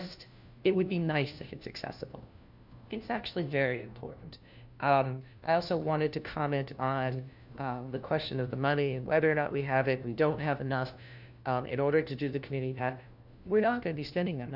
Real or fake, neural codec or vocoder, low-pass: fake; codec, 16 kHz, 1 kbps, FunCodec, trained on LibriTTS, 50 frames a second; 5.4 kHz